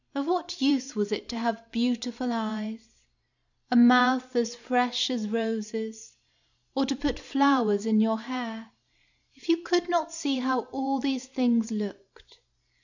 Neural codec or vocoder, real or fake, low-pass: vocoder, 44.1 kHz, 128 mel bands every 512 samples, BigVGAN v2; fake; 7.2 kHz